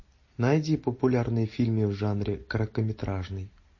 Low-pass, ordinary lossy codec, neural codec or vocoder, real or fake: 7.2 kHz; MP3, 32 kbps; none; real